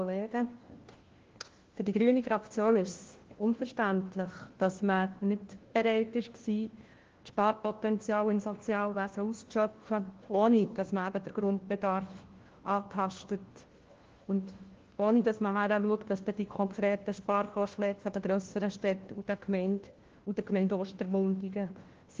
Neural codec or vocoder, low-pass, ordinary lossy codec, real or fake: codec, 16 kHz, 1 kbps, FunCodec, trained on LibriTTS, 50 frames a second; 7.2 kHz; Opus, 16 kbps; fake